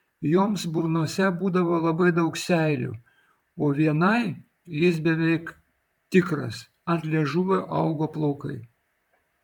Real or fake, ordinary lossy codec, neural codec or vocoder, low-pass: fake; MP3, 96 kbps; vocoder, 44.1 kHz, 128 mel bands, Pupu-Vocoder; 19.8 kHz